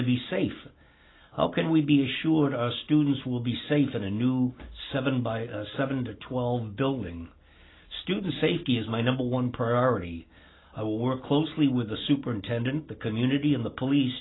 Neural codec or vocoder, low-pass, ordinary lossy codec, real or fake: none; 7.2 kHz; AAC, 16 kbps; real